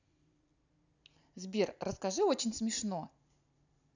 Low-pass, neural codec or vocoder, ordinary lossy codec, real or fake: 7.2 kHz; none; none; real